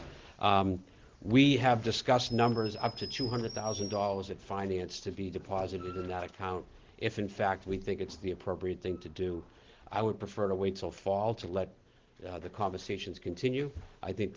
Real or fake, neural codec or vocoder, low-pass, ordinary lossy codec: real; none; 7.2 kHz; Opus, 16 kbps